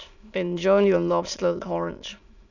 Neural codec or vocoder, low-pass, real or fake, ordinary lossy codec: autoencoder, 22.05 kHz, a latent of 192 numbers a frame, VITS, trained on many speakers; 7.2 kHz; fake; none